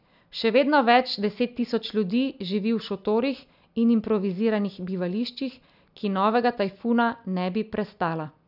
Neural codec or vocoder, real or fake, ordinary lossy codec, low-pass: none; real; none; 5.4 kHz